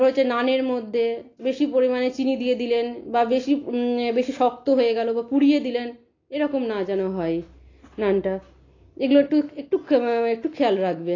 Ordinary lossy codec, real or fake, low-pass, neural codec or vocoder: AAC, 32 kbps; real; 7.2 kHz; none